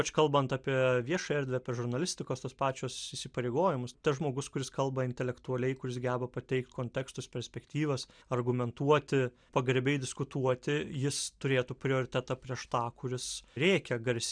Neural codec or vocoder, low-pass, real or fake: none; 9.9 kHz; real